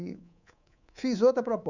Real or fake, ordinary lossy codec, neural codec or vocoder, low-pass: fake; none; codec, 24 kHz, 3.1 kbps, DualCodec; 7.2 kHz